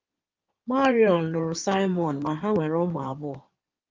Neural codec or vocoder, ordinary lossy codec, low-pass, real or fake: codec, 16 kHz in and 24 kHz out, 2.2 kbps, FireRedTTS-2 codec; Opus, 24 kbps; 7.2 kHz; fake